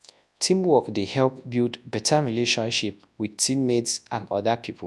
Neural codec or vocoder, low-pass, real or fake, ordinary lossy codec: codec, 24 kHz, 0.9 kbps, WavTokenizer, large speech release; none; fake; none